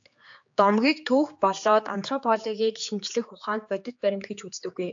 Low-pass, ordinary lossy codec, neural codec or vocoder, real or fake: 7.2 kHz; AAC, 64 kbps; codec, 16 kHz, 4 kbps, X-Codec, HuBERT features, trained on general audio; fake